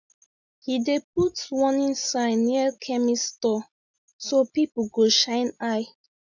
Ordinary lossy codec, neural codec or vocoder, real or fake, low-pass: none; none; real; 7.2 kHz